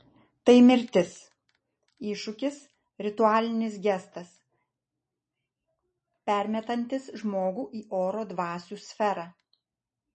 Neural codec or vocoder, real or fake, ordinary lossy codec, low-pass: none; real; MP3, 32 kbps; 10.8 kHz